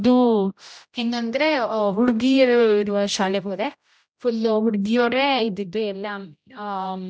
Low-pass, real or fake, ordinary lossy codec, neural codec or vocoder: none; fake; none; codec, 16 kHz, 0.5 kbps, X-Codec, HuBERT features, trained on general audio